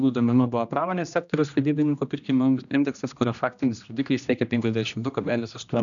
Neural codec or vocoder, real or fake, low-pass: codec, 16 kHz, 1 kbps, X-Codec, HuBERT features, trained on general audio; fake; 7.2 kHz